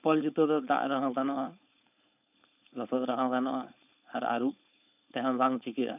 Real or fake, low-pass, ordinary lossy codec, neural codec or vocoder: fake; 3.6 kHz; none; codec, 16 kHz, 4.8 kbps, FACodec